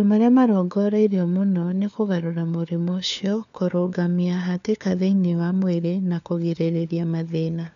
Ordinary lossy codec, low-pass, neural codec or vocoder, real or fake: none; 7.2 kHz; codec, 16 kHz, 4 kbps, FunCodec, trained on LibriTTS, 50 frames a second; fake